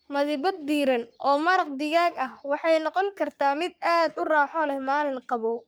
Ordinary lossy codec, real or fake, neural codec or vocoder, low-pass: none; fake; codec, 44.1 kHz, 3.4 kbps, Pupu-Codec; none